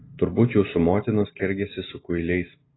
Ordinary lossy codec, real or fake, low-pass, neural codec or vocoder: AAC, 16 kbps; real; 7.2 kHz; none